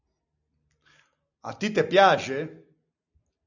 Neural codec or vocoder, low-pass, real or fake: none; 7.2 kHz; real